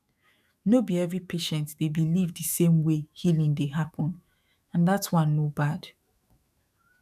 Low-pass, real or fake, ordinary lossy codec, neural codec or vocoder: 14.4 kHz; fake; none; autoencoder, 48 kHz, 128 numbers a frame, DAC-VAE, trained on Japanese speech